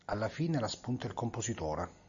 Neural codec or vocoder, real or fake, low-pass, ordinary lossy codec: none; real; 7.2 kHz; AAC, 64 kbps